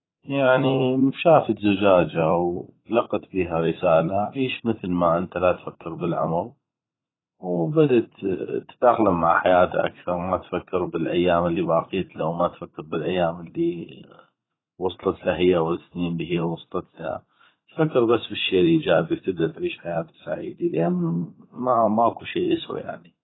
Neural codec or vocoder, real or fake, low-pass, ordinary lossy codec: vocoder, 44.1 kHz, 80 mel bands, Vocos; fake; 7.2 kHz; AAC, 16 kbps